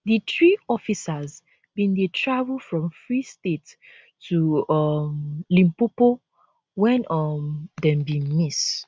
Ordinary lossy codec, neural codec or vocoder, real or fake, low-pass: none; none; real; none